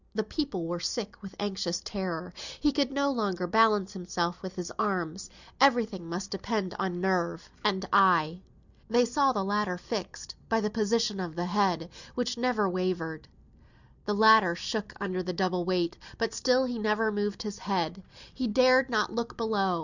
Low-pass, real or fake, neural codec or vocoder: 7.2 kHz; real; none